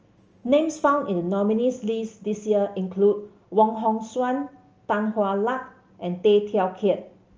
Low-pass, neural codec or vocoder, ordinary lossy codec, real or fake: 7.2 kHz; none; Opus, 24 kbps; real